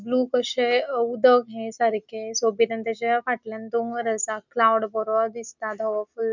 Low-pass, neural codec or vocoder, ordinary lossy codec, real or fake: 7.2 kHz; none; none; real